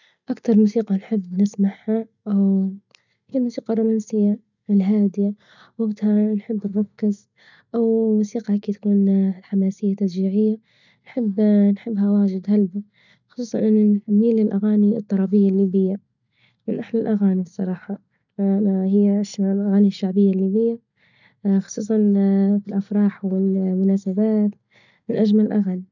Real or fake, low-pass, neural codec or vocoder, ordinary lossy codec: real; 7.2 kHz; none; none